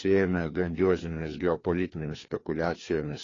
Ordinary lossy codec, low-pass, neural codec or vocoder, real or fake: AAC, 32 kbps; 7.2 kHz; codec, 16 kHz, 2 kbps, FreqCodec, larger model; fake